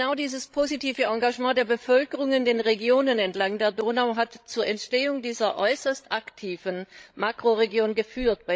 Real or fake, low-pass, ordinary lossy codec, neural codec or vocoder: fake; none; none; codec, 16 kHz, 16 kbps, FreqCodec, larger model